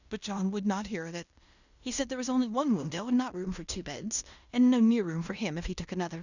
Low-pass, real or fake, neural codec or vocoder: 7.2 kHz; fake; codec, 16 kHz in and 24 kHz out, 0.9 kbps, LongCat-Audio-Codec, fine tuned four codebook decoder